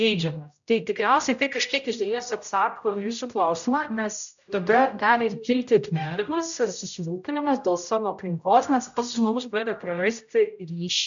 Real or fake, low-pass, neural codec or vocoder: fake; 7.2 kHz; codec, 16 kHz, 0.5 kbps, X-Codec, HuBERT features, trained on general audio